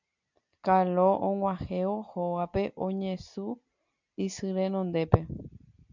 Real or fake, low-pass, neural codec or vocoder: real; 7.2 kHz; none